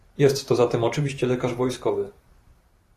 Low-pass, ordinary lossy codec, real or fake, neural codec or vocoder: 14.4 kHz; AAC, 48 kbps; fake; vocoder, 44.1 kHz, 128 mel bands every 512 samples, BigVGAN v2